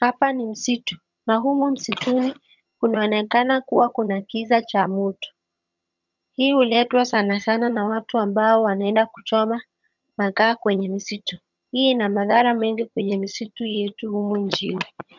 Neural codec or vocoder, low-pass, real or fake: vocoder, 22.05 kHz, 80 mel bands, HiFi-GAN; 7.2 kHz; fake